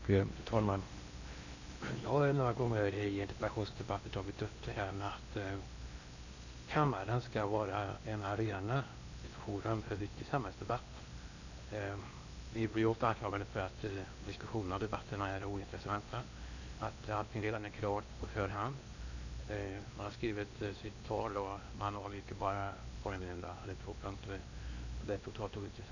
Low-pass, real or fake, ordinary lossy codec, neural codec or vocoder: 7.2 kHz; fake; none; codec, 16 kHz in and 24 kHz out, 0.8 kbps, FocalCodec, streaming, 65536 codes